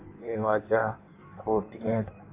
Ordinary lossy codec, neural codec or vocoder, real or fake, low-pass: AAC, 24 kbps; codec, 16 kHz in and 24 kHz out, 1.1 kbps, FireRedTTS-2 codec; fake; 3.6 kHz